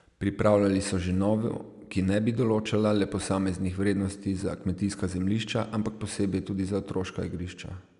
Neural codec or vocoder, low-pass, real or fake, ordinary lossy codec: none; 10.8 kHz; real; none